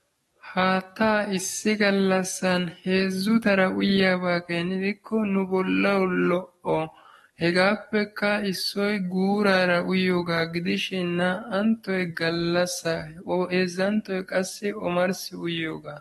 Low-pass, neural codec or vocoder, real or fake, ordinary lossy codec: 19.8 kHz; codec, 44.1 kHz, 7.8 kbps, DAC; fake; AAC, 32 kbps